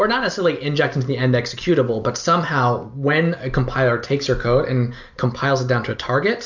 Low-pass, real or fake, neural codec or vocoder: 7.2 kHz; real; none